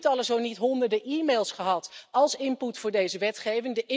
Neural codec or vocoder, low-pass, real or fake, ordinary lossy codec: none; none; real; none